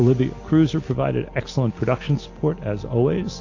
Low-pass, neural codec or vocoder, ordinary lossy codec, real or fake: 7.2 kHz; none; AAC, 48 kbps; real